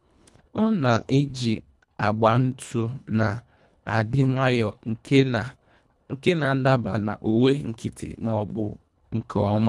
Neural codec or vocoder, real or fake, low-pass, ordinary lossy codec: codec, 24 kHz, 1.5 kbps, HILCodec; fake; none; none